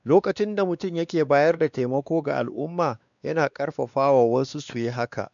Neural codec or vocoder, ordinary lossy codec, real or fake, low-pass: codec, 16 kHz, 2 kbps, X-Codec, WavLM features, trained on Multilingual LibriSpeech; none; fake; 7.2 kHz